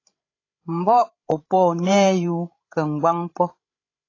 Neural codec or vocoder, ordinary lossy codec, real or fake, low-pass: codec, 16 kHz, 16 kbps, FreqCodec, larger model; AAC, 32 kbps; fake; 7.2 kHz